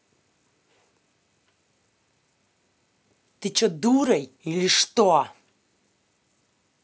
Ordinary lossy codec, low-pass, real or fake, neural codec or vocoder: none; none; real; none